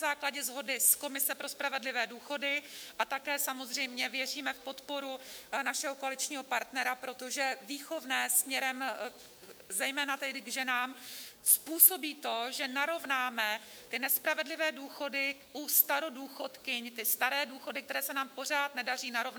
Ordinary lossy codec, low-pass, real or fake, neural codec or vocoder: MP3, 96 kbps; 19.8 kHz; fake; autoencoder, 48 kHz, 128 numbers a frame, DAC-VAE, trained on Japanese speech